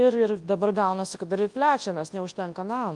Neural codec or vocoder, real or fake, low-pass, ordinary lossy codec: codec, 24 kHz, 0.9 kbps, WavTokenizer, large speech release; fake; 10.8 kHz; Opus, 32 kbps